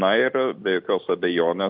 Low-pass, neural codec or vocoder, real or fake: 5.4 kHz; none; real